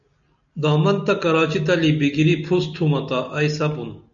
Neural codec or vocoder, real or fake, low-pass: none; real; 7.2 kHz